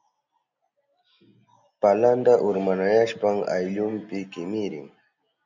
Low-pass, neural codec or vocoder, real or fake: 7.2 kHz; none; real